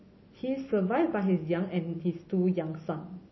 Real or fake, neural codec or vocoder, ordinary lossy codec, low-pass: real; none; MP3, 24 kbps; 7.2 kHz